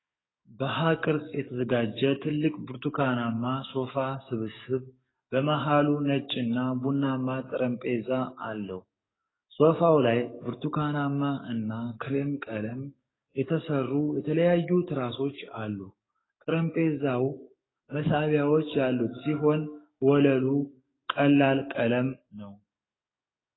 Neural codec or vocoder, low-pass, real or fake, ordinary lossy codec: codec, 44.1 kHz, 7.8 kbps, DAC; 7.2 kHz; fake; AAC, 16 kbps